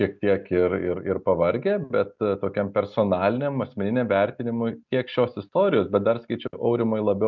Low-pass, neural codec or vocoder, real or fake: 7.2 kHz; none; real